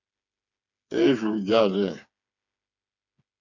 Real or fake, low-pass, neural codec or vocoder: fake; 7.2 kHz; codec, 16 kHz, 4 kbps, FreqCodec, smaller model